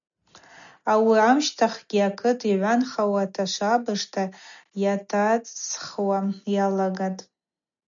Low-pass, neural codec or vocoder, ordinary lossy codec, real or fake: 7.2 kHz; none; MP3, 96 kbps; real